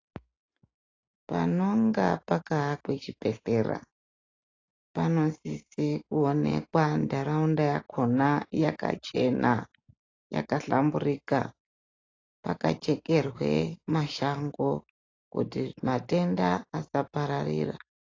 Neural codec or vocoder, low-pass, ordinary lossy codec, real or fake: none; 7.2 kHz; AAC, 32 kbps; real